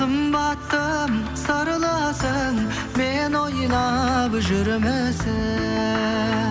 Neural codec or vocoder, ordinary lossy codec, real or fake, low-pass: none; none; real; none